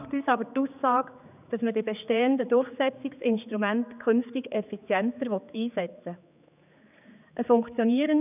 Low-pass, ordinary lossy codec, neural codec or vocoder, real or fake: 3.6 kHz; none; codec, 16 kHz, 4 kbps, X-Codec, HuBERT features, trained on general audio; fake